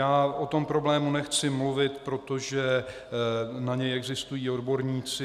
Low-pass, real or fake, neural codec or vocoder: 14.4 kHz; real; none